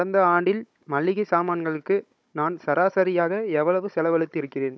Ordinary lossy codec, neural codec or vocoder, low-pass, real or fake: none; codec, 16 kHz, 16 kbps, FunCodec, trained on Chinese and English, 50 frames a second; none; fake